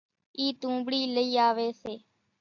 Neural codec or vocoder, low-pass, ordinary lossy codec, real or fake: none; 7.2 kHz; AAC, 48 kbps; real